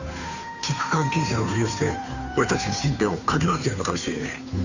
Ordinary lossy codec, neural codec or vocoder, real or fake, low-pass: none; codec, 16 kHz, 2 kbps, FunCodec, trained on Chinese and English, 25 frames a second; fake; 7.2 kHz